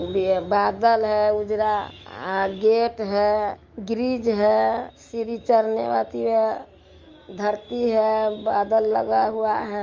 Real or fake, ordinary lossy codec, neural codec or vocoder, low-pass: fake; Opus, 32 kbps; autoencoder, 48 kHz, 128 numbers a frame, DAC-VAE, trained on Japanese speech; 7.2 kHz